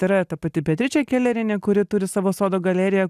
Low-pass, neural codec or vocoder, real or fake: 14.4 kHz; none; real